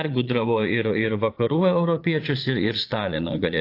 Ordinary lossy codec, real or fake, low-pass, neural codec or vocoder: AAC, 32 kbps; fake; 5.4 kHz; vocoder, 22.05 kHz, 80 mel bands, Vocos